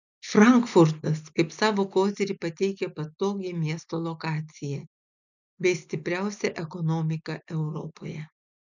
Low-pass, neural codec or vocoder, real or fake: 7.2 kHz; none; real